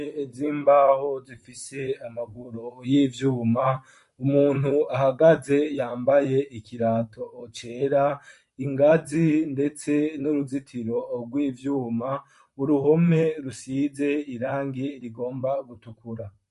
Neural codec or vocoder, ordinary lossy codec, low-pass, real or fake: vocoder, 44.1 kHz, 128 mel bands, Pupu-Vocoder; MP3, 48 kbps; 14.4 kHz; fake